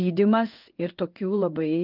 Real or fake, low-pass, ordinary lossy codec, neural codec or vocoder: fake; 5.4 kHz; Opus, 32 kbps; codec, 16 kHz in and 24 kHz out, 1 kbps, XY-Tokenizer